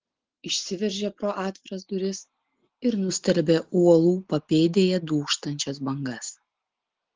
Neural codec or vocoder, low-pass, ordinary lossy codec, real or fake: none; 7.2 kHz; Opus, 16 kbps; real